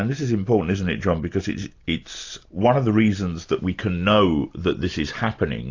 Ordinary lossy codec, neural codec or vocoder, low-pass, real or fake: AAC, 48 kbps; none; 7.2 kHz; real